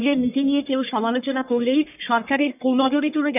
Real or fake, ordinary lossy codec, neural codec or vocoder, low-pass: fake; none; codec, 44.1 kHz, 1.7 kbps, Pupu-Codec; 3.6 kHz